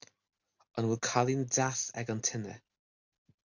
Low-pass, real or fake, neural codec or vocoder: 7.2 kHz; real; none